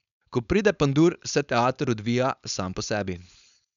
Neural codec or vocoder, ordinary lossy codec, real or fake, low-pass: codec, 16 kHz, 4.8 kbps, FACodec; none; fake; 7.2 kHz